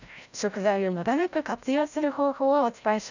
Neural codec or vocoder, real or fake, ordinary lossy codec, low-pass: codec, 16 kHz, 0.5 kbps, FreqCodec, larger model; fake; none; 7.2 kHz